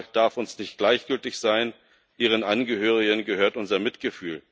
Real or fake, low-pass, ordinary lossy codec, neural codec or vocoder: real; none; none; none